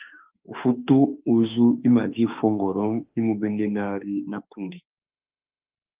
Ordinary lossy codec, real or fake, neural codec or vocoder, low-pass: Opus, 32 kbps; fake; autoencoder, 48 kHz, 32 numbers a frame, DAC-VAE, trained on Japanese speech; 3.6 kHz